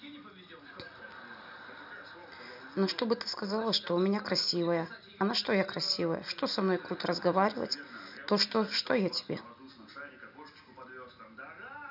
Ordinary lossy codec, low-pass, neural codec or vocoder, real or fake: none; 5.4 kHz; none; real